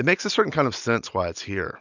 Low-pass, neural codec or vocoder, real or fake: 7.2 kHz; none; real